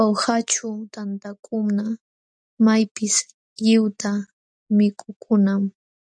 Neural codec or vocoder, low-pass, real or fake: none; 9.9 kHz; real